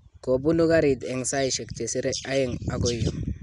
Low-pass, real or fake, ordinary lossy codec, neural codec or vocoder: 10.8 kHz; real; none; none